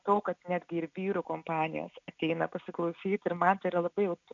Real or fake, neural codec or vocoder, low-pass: real; none; 7.2 kHz